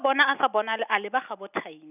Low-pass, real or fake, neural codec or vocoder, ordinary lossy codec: 3.6 kHz; real; none; none